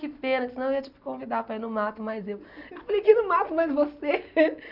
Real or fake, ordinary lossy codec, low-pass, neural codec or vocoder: real; none; 5.4 kHz; none